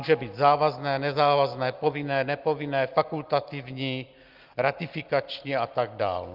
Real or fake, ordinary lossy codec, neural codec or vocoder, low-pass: real; Opus, 24 kbps; none; 5.4 kHz